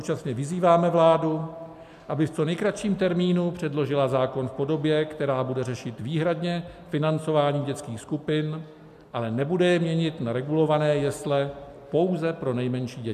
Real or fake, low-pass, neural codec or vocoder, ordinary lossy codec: real; 14.4 kHz; none; MP3, 96 kbps